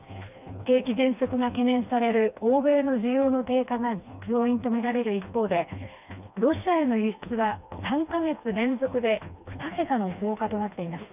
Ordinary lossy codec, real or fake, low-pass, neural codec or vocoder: none; fake; 3.6 kHz; codec, 16 kHz, 2 kbps, FreqCodec, smaller model